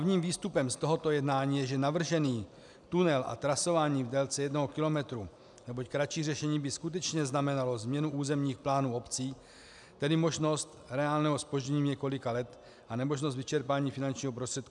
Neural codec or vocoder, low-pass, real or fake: none; 10.8 kHz; real